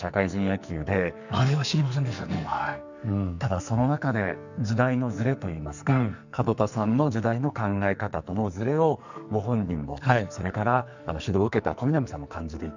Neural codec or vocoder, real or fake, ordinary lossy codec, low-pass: codec, 44.1 kHz, 2.6 kbps, SNAC; fake; none; 7.2 kHz